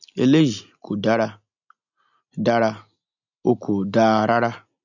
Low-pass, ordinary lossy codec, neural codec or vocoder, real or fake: 7.2 kHz; none; none; real